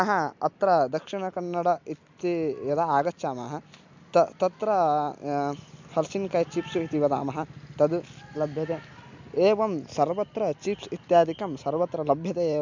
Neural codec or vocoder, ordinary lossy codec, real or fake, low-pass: none; MP3, 64 kbps; real; 7.2 kHz